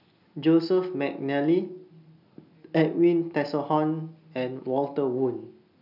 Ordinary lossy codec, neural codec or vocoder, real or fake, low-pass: none; none; real; 5.4 kHz